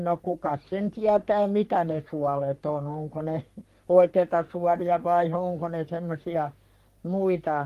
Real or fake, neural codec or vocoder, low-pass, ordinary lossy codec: fake; codec, 44.1 kHz, 3.4 kbps, Pupu-Codec; 14.4 kHz; Opus, 32 kbps